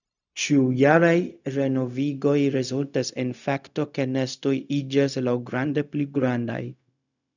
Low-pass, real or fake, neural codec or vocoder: 7.2 kHz; fake; codec, 16 kHz, 0.4 kbps, LongCat-Audio-Codec